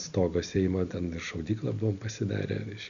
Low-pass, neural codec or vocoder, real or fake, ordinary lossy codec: 7.2 kHz; none; real; MP3, 96 kbps